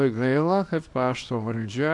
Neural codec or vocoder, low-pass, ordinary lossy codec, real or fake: codec, 24 kHz, 0.9 kbps, WavTokenizer, small release; 10.8 kHz; AAC, 64 kbps; fake